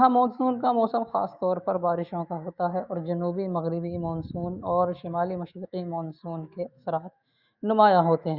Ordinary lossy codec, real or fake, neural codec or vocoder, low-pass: Opus, 64 kbps; fake; autoencoder, 48 kHz, 128 numbers a frame, DAC-VAE, trained on Japanese speech; 5.4 kHz